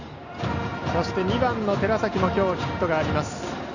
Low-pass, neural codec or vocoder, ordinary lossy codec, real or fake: 7.2 kHz; none; none; real